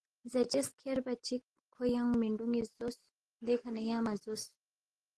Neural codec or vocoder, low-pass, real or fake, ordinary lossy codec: none; 10.8 kHz; real; Opus, 16 kbps